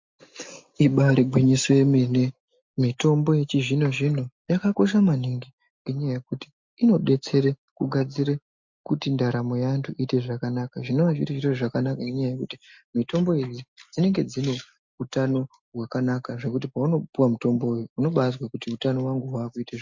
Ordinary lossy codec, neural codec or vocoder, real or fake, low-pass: MP3, 48 kbps; none; real; 7.2 kHz